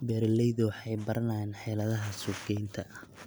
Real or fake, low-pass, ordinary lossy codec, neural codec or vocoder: real; none; none; none